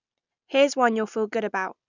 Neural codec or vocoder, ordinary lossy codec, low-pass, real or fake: none; none; 7.2 kHz; real